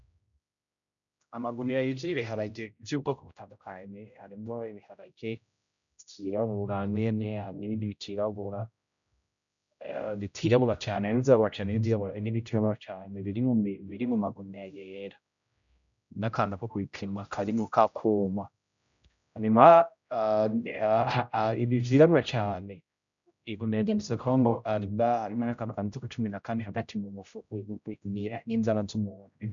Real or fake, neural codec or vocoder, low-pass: fake; codec, 16 kHz, 0.5 kbps, X-Codec, HuBERT features, trained on general audio; 7.2 kHz